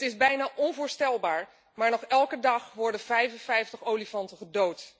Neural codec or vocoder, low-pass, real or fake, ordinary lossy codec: none; none; real; none